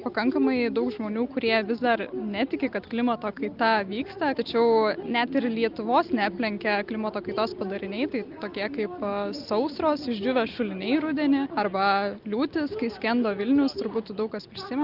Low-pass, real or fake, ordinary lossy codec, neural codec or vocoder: 5.4 kHz; real; Opus, 32 kbps; none